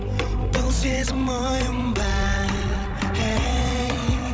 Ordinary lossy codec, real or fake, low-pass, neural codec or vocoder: none; fake; none; codec, 16 kHz, 16 kbps, FreqCodec, larger model